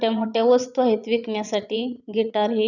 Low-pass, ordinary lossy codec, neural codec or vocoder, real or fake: 7.2 kHz; none; vocoder, 22.05 kHz, 80 mel bands, Vocos; fake